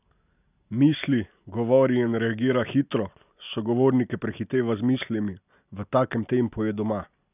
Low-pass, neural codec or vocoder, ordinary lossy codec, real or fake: 3.6 kHz; none; none; real